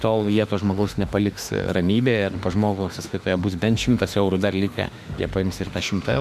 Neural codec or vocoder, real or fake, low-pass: autoencoder, 48 kHz, 32 numbers a frame, DAC-VAE, trained on Japanese speech; fake; 14.4 kHz